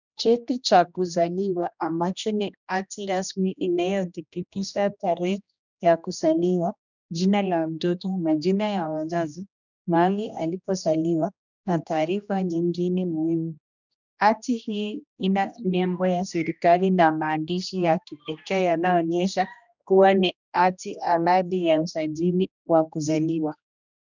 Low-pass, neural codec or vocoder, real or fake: 7.2 kHz; codec, 16 kHz, 1 kbps, X-Codec, HuBERT features, trained on general audio; fake